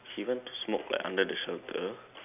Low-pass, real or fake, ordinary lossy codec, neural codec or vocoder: 3.6 kHz; real; none; none